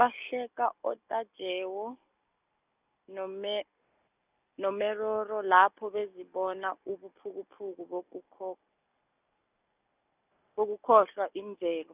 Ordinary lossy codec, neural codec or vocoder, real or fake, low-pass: none; none; real; 3.6 kHz